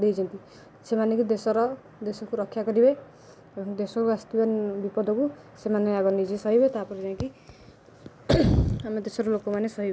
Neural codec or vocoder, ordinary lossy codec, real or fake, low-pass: none; none; real; none